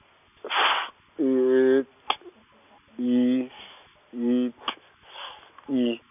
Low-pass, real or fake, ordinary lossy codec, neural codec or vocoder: 3.6 kHz; real; none; none